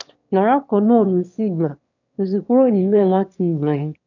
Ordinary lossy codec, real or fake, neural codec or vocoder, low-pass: none; fake; autoencoder, 22.05 kHz, a latent of 192 numbers a frame, VITS, trained on one speaker; 7.2 kHz